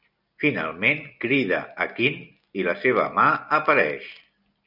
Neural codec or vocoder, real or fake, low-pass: none; real; 5.4 kHz